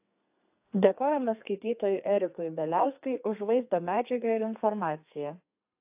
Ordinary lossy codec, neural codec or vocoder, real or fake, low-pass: AAC, 32 kbps; codec, 32 kHz, 1.9 kbps, SNAC; fake; 3.6 kHz